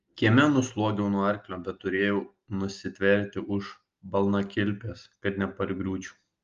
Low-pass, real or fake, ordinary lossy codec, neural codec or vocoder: 7.2 kHz; real; Opus, 32 kbps; none